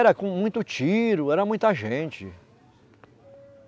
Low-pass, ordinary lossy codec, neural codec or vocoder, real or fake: none; none; none; real